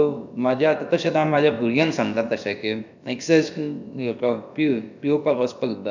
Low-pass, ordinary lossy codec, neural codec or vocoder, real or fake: 7.2 kHz; none; codec, 16 kHz, about 1 kbps, DyCAST, with the encoder's durations; fake